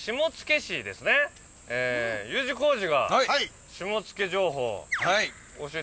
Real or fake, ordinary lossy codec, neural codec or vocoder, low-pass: real; none; none; none